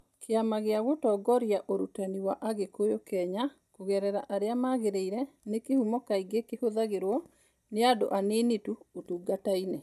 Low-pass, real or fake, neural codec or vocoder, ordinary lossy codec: 14.4 kHz; real; none; none